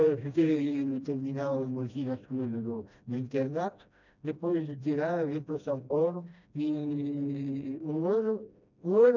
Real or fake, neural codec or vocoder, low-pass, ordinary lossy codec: fake; codec, 16 kHz, 1 kbps, FreqCodec, smaller model; 7.2 kHz; none